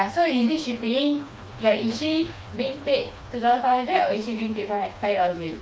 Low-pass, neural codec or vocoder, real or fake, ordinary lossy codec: none; codec, 16 kHz, 2 kbps, FreqCodec, smaller model; fake; none